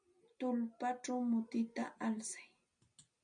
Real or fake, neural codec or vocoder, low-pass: real; none; 9.9 kHz